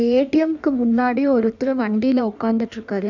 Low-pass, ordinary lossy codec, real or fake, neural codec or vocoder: 7.2 kHz; none; fake; codec, 16 kHz in and 24 kHz out, 1.1 kbps, FireRedTTS-2 codec